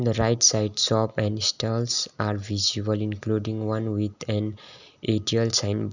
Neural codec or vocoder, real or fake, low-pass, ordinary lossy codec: none; real; 7.2 kHz; none